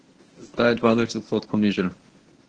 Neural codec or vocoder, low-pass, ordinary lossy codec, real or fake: codec, 24 kHz, 0.9 kbps, WavTokenizer, medium speech release version 1; 9.9 kHz; Opus, 16 kbps; fake